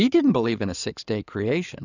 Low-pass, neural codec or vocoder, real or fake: 7.2 kHz; codec, 16 kHz in and 24 kHz out, 2.2 kbps, FireRedTTS-2 codec; fake